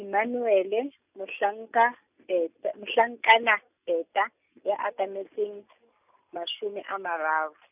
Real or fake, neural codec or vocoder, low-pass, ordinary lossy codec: real; none; 3.6 kHz; none